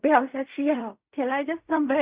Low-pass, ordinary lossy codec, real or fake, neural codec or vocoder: 3.6 kHz; Opus, 64 kbps; fake; codec, 16 kHz in and 24 kHz out, 0.4 kbps, LongCat-Audio-Codec, fine tuned four codebook decoder